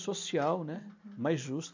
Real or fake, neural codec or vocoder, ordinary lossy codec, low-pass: real; none; MP3, 64 kbps; 7.2 kHz